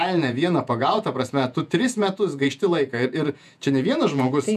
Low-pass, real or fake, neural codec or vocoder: 14.4 kHz; fake; vocoder, 48 kHz, 128 mel bands, Vocos